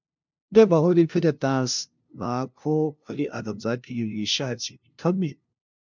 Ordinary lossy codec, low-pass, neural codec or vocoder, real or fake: MP3, 64 kbps; 7.2 kHz; codec, 16 kHz, 0.5 kbps, FunCodec, trained on LibriTTS, 25 frames a second; fake